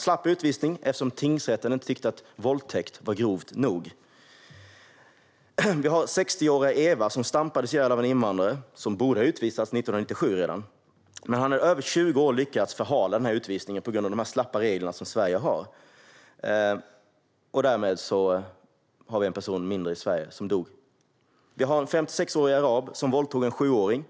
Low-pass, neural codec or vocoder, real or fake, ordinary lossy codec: none; none; real; none